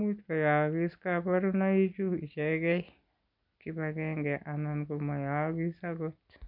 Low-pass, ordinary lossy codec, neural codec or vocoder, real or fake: 5.4 kHz; none; none; real